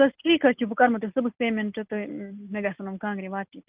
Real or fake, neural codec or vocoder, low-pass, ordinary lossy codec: real; none; 3.6 kHz; Opus, 32 kbps